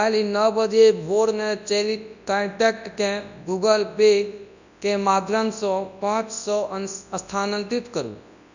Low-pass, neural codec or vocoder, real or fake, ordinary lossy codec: 7.2 kHz; codec, 24 kHz, 0.9 kbps, WavTokenizer, large speech release; fake; none